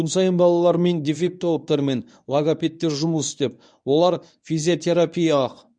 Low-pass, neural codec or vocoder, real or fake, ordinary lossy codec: 9.9 kHz; codec, 24 kHz, 0.9 kbps, WavTokenizer, medium speech release version 1; fake; none